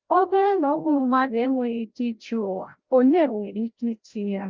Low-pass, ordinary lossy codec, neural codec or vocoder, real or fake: 7.2 kHz; Opus, 24 kbps; codec, 16 kHz, 0.5 kbps, FreqCodec, larger model; fake